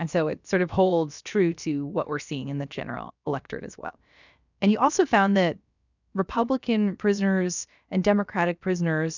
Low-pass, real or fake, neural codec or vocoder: 7.2 kHz; fake; codec, 16 kHz, about 1 kbps, DyCAST, with the encoder's durations